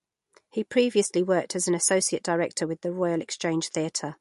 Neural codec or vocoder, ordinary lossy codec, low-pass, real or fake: none; MP3, 48 kbps; 14.4 kHz; real